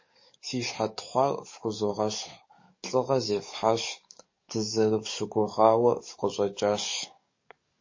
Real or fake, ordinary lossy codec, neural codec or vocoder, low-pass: fake; MP3, 32 kbps; codec, 44.1 kHz, 7.8 kbps, DAC; 7.2 kHz